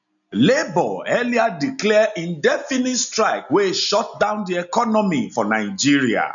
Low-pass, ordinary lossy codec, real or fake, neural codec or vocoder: 7.2 kHz; none; real; none